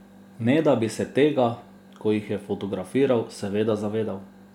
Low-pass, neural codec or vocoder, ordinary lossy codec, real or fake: 19.8 kHz; none; MP3, 96 kbps; real